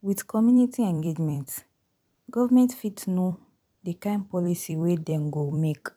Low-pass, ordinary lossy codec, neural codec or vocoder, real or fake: 19.8 kHz; none; vocoder, 44.1 kHz, 128 mel bands every 256 samples, BigVGAN v2; fake